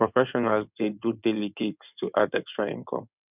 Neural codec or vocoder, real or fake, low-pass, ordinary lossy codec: codec, 16 kHz in and 24 kHz out, 2.2 kbps, FireRedTTS-2 codec; fake; 3.6 kHz; none